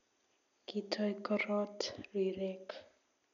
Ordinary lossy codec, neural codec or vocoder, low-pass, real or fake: none; none; 7.2 kHz; real